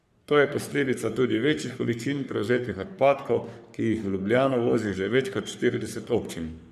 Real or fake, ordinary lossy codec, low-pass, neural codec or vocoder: fake; none; 14.4 kHz; codec, 44.1 kHz, 3.4 kbps, Pupu-Codec